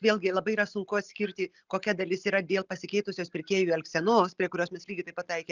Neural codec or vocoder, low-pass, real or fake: none; 7.2 kHz; real